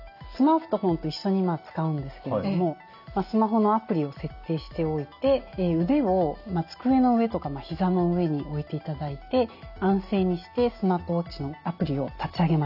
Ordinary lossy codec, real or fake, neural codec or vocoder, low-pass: none; real; none; 5.4 kHz